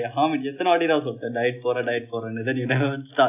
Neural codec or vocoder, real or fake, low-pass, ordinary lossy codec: none; real; 3.6 kHz; none